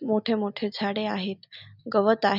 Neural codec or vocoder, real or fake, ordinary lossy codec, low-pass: none; real; none; 5.4 kHz